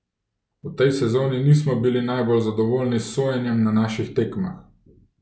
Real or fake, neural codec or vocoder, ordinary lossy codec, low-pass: real; none; none; none